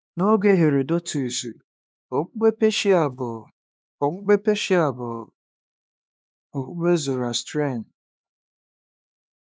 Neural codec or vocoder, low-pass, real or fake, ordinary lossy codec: codec, 16 kHz, 2 kbps, X-Codec, HuBERT features, trained on LibriSpeech; none; fake; none